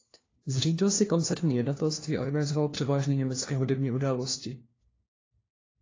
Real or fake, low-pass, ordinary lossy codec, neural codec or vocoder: fake; 7.2 kHz; AAC, 32 kbps; codec, 16 kHz, 1 kbps, FunCodec, trained on LibriTTS, 50 frames a second